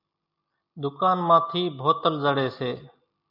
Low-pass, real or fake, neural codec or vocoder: 5.4 kHz; real; none